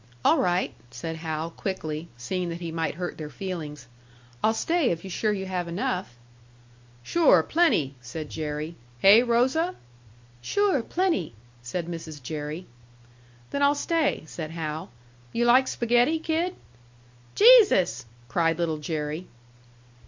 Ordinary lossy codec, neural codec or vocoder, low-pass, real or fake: MP3, 48 kbps; none; 7.2 kHz; real